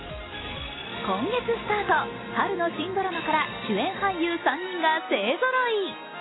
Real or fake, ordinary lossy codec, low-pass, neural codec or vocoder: real; AAC, 16 kbps; 7.2 kHz; none